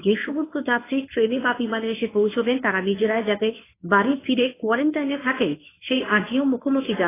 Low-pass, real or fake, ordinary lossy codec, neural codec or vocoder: 3.6 kHz; fake; AAC, 16 kbps; codec, 24 kHz, 0.9 kbps, WavTokenizer, medium speech release version 1